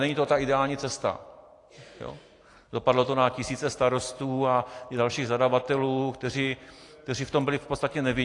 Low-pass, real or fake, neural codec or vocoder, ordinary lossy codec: 10.8 kHz; real; none; AAC, 48 kbps